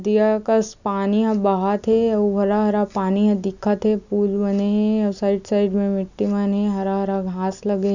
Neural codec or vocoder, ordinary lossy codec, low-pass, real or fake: none; none; 7.2 kHz; real